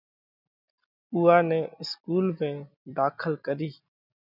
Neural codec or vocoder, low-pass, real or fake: none; 5.4 kHz; real